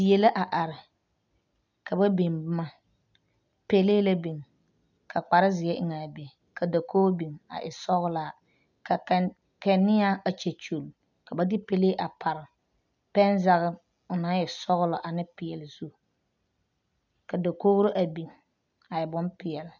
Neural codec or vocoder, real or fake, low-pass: none; real; 7.2 kHz